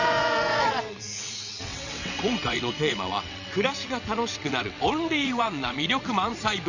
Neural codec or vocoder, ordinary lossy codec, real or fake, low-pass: vocoder, 22.05 kHz, 80 mel bands, WaveNeXt; none; fake; 7.2 kHz